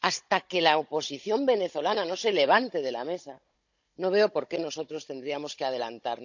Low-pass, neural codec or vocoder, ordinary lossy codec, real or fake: 7.2 kHz; codec, 16 kHz, 16 kbps, FunCodec, trained on Chinese and English, 50 frames a second; none; fake